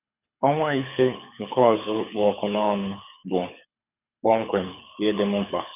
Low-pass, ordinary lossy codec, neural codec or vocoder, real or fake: 3.6 kHz; none; codec, 24 kHz, 6 kbps, HILCodec; fake